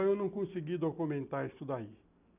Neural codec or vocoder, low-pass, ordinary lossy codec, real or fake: none; 3.6 kHz; none; real